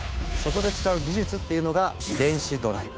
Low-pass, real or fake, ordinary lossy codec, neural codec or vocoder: none; fake; none; codec, 16 kHz, 2 kbps, FunCodec, trained on Chinese and English, 25 frames a second